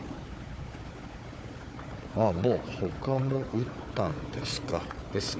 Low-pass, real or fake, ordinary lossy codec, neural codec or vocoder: none; fake; none; codec, 16 kHz, 4 kbps, FunCodec, trained on Chinese and English, 50 frames a second